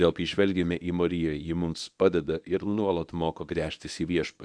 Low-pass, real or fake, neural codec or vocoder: 9.9 kHz; fake; codec, 24 kHz, 0.9 kbps, WavTokenizer, medium speech release version 1